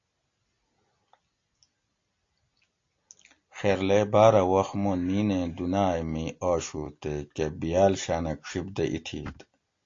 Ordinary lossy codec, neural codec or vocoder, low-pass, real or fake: AAC, 48 kbps; none; 7.2 kHz; real